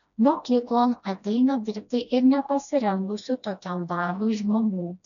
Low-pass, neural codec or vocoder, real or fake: 7.2 kHz; codec, 16 kHz, 1 kbps, FreqCodec, smaller model; fake